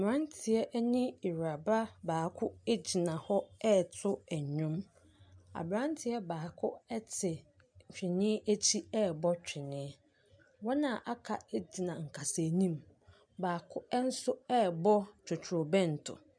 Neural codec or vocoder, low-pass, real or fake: none; 9.9 kHz; real